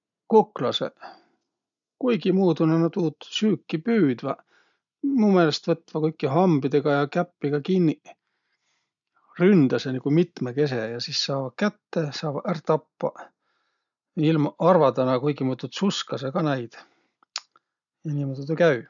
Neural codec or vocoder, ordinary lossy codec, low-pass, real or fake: none; none; 7.2 kHz; real